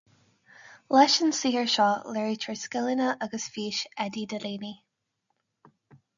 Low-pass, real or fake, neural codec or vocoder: 7.2 kHz; real; none